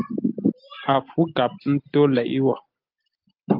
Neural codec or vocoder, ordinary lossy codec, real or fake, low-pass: none; Opus, 24 kbps; real; 5.4 kHz